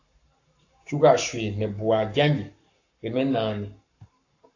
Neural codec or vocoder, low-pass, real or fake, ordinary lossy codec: codec, 44.1 kHz, 7.8 kbps, Pupu-Codec; 7.2 kHz; fake; AAC, 48 kbps